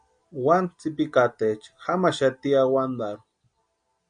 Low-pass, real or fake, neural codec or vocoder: 9.9 kHz; real; none